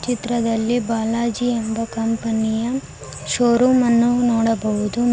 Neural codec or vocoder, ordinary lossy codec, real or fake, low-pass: none; none; real; none